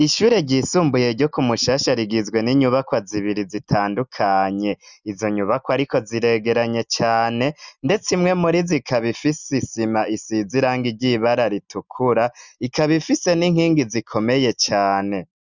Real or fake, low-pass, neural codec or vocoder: real; 7.2 kHz; none